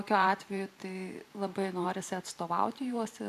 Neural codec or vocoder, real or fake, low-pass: vocoder, 44.1 kHz, 128 mel bands every 512 samples, BigVGAN v2; fake; 14.4 kHz